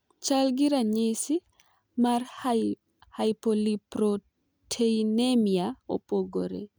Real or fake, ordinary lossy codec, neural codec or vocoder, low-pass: real; none; none; none